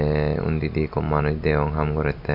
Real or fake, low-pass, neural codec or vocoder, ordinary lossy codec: real; 5.4 kHz; none; none